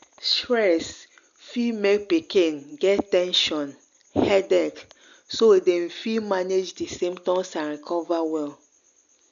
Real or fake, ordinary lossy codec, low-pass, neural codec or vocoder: real; none; 7.2 kHz; none